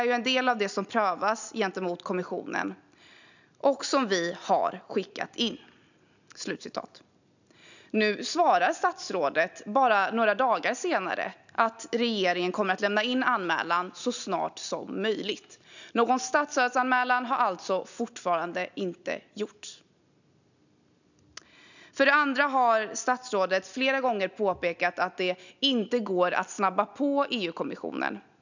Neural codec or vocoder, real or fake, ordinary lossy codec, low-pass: none; real; none; 7.2 kHz